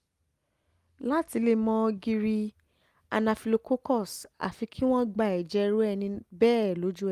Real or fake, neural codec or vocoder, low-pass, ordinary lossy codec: real; none; 14.4 kHz; Opus, 32 kbps